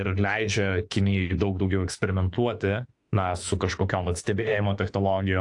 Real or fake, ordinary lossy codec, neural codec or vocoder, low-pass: fake; AAC, 64 kbps; autoencoder, 48 kHz, 32 numbers a frame, DAC-VAE, trained on Japanese speech; 10.8 kHz